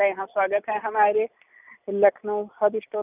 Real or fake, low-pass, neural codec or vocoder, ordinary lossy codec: real; 3.6 kHz; none; none